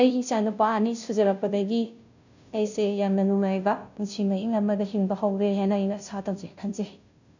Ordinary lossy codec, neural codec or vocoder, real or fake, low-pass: none; codec, 16 kHz, 0.5 kbps, FunCodec, trained on Chinese and English, 25 frames a second; fake; 7.2 kHz